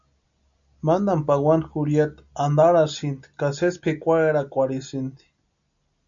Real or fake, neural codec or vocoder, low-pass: real; none; 7.2 kHz